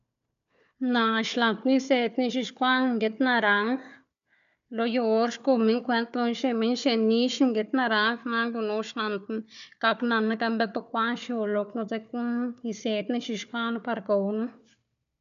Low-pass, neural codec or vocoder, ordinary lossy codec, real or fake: 7.2 kHz; codec, 16 kHz, 4 kbps, FunCodec, trained on Chinese and English, 50 frames a second; none; fake